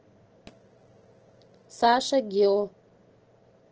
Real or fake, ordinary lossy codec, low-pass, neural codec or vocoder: real; Opus, 16 kbps; 7.2 kHz; none